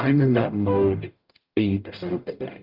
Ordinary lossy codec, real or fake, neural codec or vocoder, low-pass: Opus, 24 kbps; fake; codec, 44.1 kHz, 0.9 kbps, DAC; 5.4 kHz